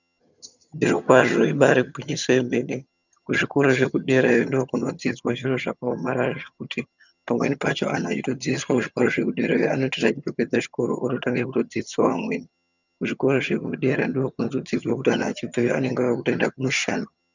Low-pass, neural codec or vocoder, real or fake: 7.2 kHz; vocoder, 22.05 kHz, 80 mel bands, HiFi-GAN; fake